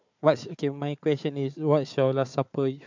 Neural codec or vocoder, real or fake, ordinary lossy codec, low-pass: codec, 16 kHz, 4 kbps, FunCodec, trained on Chinese and English, 50 frames a second; fake; MP3, 64 kbps; 7.2 kHz